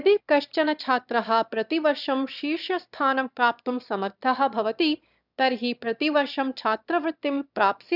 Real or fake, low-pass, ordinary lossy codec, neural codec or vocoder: fake; 5.4 kHz; none; autoencoder, 22.05 kHz, a latent of 192 numbers a frame, VITS, trained on one speaker